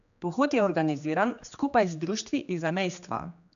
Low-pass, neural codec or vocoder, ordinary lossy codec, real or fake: 7.2 kHz; codec, 16 kHz, 2 kbps, X-Codec, HuBERT features, trained on general audio; none; fake